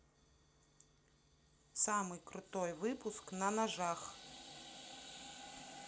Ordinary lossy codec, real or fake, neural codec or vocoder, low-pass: none; real; none; none